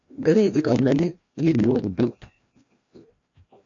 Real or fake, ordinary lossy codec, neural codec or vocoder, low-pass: fake; MP3, 48 kbps; codec, 16 kHz, 1 kbps, FreqCodec, larger model; 7.2 kHz